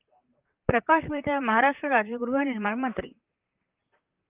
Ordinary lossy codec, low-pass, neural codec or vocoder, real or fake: Opus, 32 kbps; 3.6 kHz; vocoder, 44.1 kHz, 128 mel bands, Pupu-Vocoder; fake